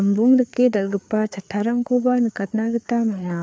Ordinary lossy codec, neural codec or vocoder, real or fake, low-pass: none; codec, 16 kHz, 4 kbps, FreqCodec, larger model; fake; none